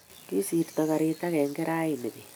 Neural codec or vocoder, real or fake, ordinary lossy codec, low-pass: none; real; none; none